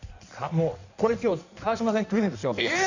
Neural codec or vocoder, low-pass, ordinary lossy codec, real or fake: codec, 16 kHz in and 24 kHz out, 1.1 kbps, FireRedTTS-2 codec; 7.2 kHz; none; fake